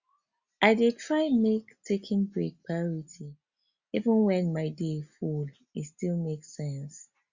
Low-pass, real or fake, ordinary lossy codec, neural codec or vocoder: 7.2 kHz; real; Opus, 64 kbps; none